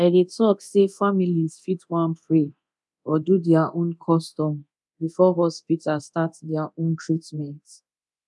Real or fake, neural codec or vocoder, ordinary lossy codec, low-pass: fake; codec, 24 kHz, 0.9 kbps, DualCodec; none; none